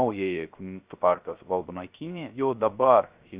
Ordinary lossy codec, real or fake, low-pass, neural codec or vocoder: Opus, 64 kbps; fake; 3.6 kHz; codec, 16 kHz, 0.3 kbps, FocalCodec